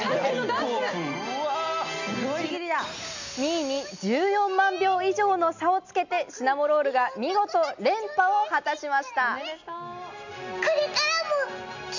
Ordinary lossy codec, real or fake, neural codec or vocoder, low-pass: none; real; none; 7.2 kHz